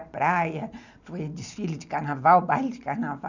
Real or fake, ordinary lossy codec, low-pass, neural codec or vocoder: real; none; 7.2 kHz; none